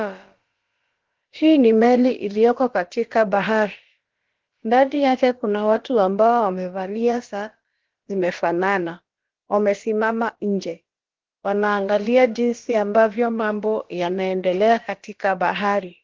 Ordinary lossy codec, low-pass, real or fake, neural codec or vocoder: Opus, 16 kbps; 7.2 kHz; fake; codec, 16 kHz, about 1 kbps, DyCAST, with the encoder's durations